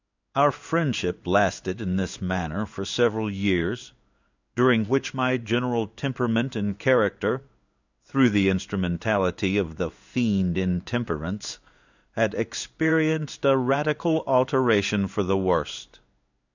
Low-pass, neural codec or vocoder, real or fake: 7.2 kHz; codec, 16 kHz in and 24 kHz out, 1 kbps, XY-Tokenizer; fake